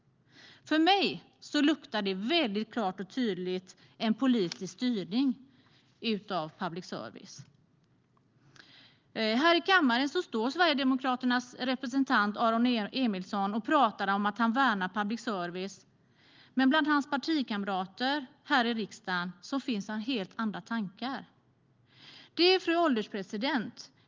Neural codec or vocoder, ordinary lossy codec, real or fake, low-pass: none; Opus, 32 kbps; real; 7.2 kHz